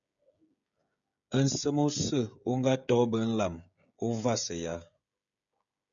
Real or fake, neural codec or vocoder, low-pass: fake; codec, 16 kHz, 16 kbps, FreqCodec, smaller model; 7.2 kHz